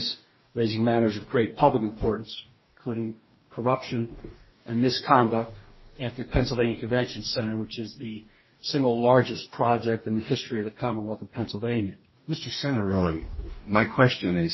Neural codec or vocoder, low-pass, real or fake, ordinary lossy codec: codec, 44.1 kHz, 2.6 kbps, DAC; 7.2 kHz; fake; MP3, 24 kbps